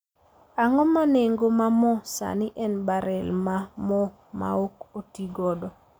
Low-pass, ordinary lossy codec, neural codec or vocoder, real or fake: none; none; none; real